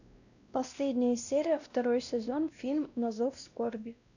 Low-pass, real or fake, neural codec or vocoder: 7.2 kHz; fake; codec, 16 kHz, 1 kbps, X-Codec, WavLM features, trained on Multilingual LibriSpeech